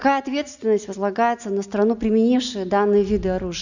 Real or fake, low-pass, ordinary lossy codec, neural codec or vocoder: real; 7.2 kHz; none; none